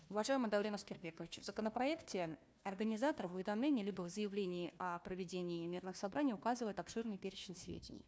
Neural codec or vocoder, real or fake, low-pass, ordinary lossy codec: codec, 16 kHz, 1 kbps, FunCodec, trained on Chinese and English, 50 frames a second; fake; none; none